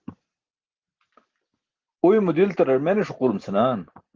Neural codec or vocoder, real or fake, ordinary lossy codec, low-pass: none; real; Opus, 16 kbps; 7.2 kHz